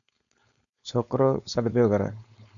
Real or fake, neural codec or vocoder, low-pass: fake; codec, 16 kHz, 4.8 kbps, FACodec; 7.2 kHz